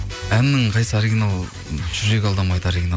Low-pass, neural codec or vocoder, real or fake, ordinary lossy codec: none; none; real; none